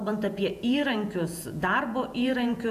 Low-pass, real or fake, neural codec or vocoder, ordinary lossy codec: 14.4 kHz; fake; vocoder, 48 kHz, 128 mel bands, Vocos; AAC, 96 kbps